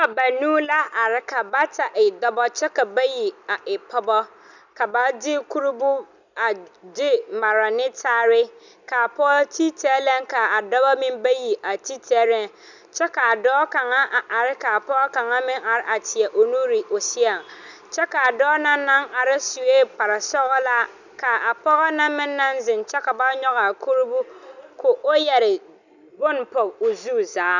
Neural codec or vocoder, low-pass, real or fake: none; 7.2 kHz; real